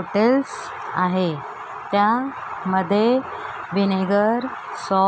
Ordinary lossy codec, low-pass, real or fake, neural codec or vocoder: none; none; real; none